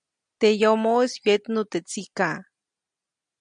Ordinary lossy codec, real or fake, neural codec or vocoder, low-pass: MP3, 96 kbps; real; none; 9.9 kHz